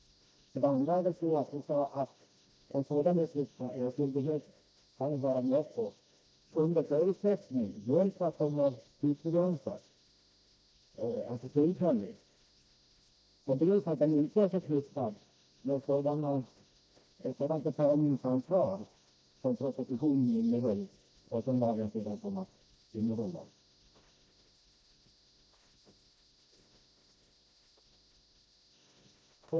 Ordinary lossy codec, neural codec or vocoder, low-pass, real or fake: none; codec, 16 kHz, 1 kbps, FreqCodec, smaller model; none; fake